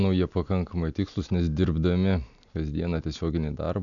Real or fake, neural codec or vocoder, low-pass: real; none; 7.2 kHz